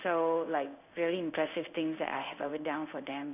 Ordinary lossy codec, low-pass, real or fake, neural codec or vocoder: none; 3.6 kHz; fake; codec, 16 kHz in and 24 kHz out, 1 kbps, XY-Tokenizer